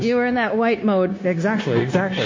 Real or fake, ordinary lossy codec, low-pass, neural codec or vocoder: fake; MP3, 32 kbps; 7.2 kHz; codec, 16 kHz, 0.9 kbps, LongCat-Audio-Codec